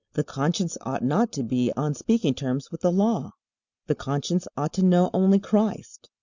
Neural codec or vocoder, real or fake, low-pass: none; real; 7.2 kHz